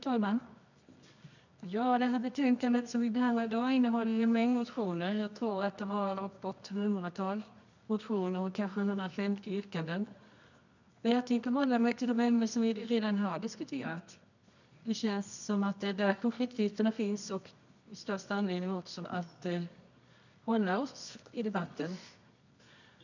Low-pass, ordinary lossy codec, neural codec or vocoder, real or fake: 7.2 kHz; none; codec, 24 kHz, 0.9 kbps, WavTokenizer, medium music audio release; fake